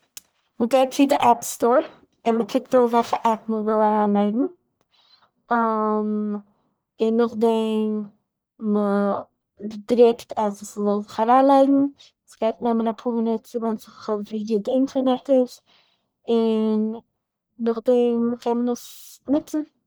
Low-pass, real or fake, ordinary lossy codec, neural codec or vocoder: none; fake; none; codec, 44.1 kHz, 1.7 kbps, Pupu-Codec